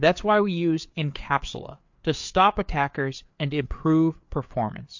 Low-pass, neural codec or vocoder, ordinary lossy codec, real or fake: 7.2 kHz; codec, 16 kHz, 4 kbps, FreqCodec, larger model; MP3, 48 kbps; fake